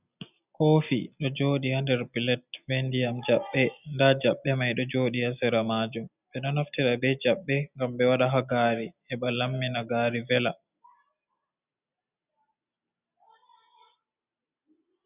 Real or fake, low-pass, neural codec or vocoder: real; 3.6 kHz; none